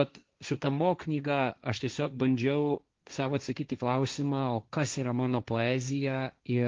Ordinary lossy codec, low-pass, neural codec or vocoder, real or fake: Opus, 32 kbps; 7.2 kHz; codec, 16 kHz, 1.1 kbps, Voila-Tokenizer; fake